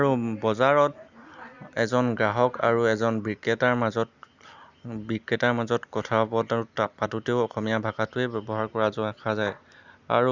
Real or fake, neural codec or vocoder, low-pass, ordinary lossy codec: real; none; 7.2 kHz; Opus, 64 kbps